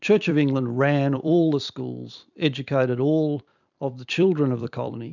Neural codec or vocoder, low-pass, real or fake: vocoder, 44.1 kHz, 128 mel bands every 256 samples, BigVGAN v2; 7.2 kHz; fake